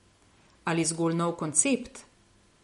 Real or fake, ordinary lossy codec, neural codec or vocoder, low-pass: real; MP3, 48 kbps; none; 14.4 kHz